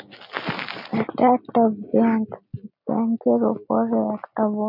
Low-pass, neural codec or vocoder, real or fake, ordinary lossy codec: 5.4 kHz; none; real; none